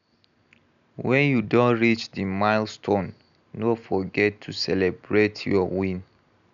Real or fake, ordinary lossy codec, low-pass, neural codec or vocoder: real; none; 7.2 kHz; none